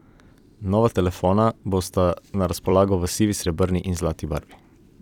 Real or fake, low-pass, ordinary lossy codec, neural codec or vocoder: fake; 19.8 kHz; none; vocoder, 44.1 kHz, 128 mel bands every 256 samples, BigVGAN v2